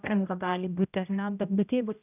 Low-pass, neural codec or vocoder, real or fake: 3.6 kHz; codec, 16 kHz, 0.5 kbps, X-Codec, HuBERT features, trained on general audio; fake